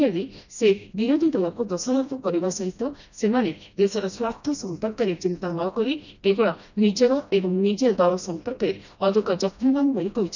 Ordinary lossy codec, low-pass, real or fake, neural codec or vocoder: none; 7.2 kHz; fake; codec, 16 kHz, 1 kbps, FreqCodec, smaller model